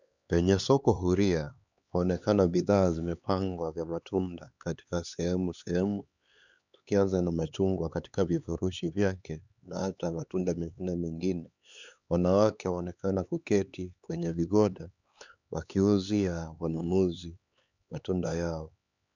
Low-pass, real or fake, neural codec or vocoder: 7.2 kHz; fake; codec, 16 kHz, 4 kbps, X-Codec, HuBERT features, trained on LibriSpeech